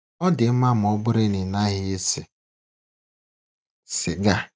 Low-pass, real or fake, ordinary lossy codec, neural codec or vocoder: none; real; none; none